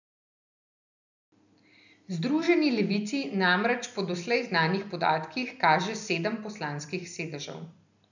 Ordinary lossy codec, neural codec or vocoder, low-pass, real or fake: none; none; 7.2 kHz; real